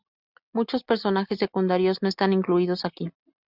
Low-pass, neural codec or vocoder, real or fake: 5.4 kHz; none; real